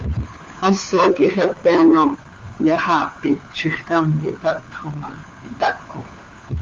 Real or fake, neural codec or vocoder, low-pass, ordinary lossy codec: fake; codec, 16 kHz, 4 kbps, FunCodec, trained on LibriTTS, 50 frames a second; 7.2 kHz; Opus, 32 kbps